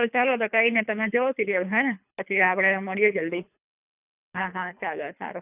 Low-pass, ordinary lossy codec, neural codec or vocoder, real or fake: 3.6 kHz; none; codec, 24 kHz, 3 kbps, HILCodec; fake